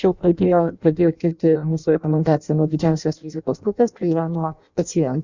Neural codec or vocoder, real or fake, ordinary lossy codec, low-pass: codec, 16 kHz in and 24 kHz out, 0.6 kbps, FireRedTTS-2 codec; fake; Opus, 64 kbps; 7.2 kHz